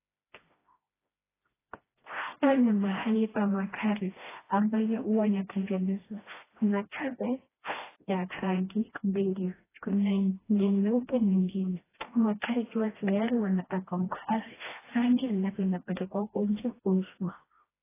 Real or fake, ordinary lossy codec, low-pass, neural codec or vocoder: fake; AAC, 16 kbps; 3.6 kHz; codec, 16 kHz, 1 kbps, FreqCodec, smaller model